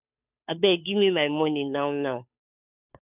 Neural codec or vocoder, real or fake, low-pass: codec, 16 kHz, 2 kbps, FunCodec, trained on Chinese and English, 25 frames a second; fake; 3.6 kHz